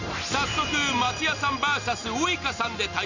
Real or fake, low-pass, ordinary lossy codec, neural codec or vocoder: real; 7.2 kHz; none; none